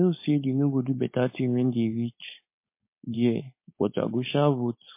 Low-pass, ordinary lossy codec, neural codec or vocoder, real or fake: 3.6 kHz; MP3, 24 kbps; codec, 16 kHz, 4.8 kbps, FACodec; fake